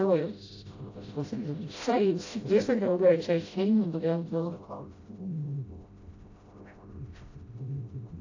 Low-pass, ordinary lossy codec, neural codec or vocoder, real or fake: 7.2 kHz; AAC, 48 kbps; codec, 16 kHz, 0.5 kbps, FreqCodec, smaller model; fake